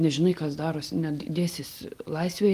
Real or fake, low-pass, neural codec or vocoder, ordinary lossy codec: real; 14.4 kHz; none; Opus, 24 kbps